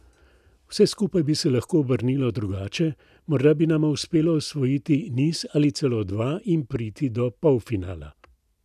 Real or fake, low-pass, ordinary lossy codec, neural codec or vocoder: real; 14.4 kHz; none; none